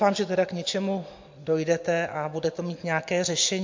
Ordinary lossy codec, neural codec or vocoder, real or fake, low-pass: MP3, 48 kbps; none; real; 7.2 kHz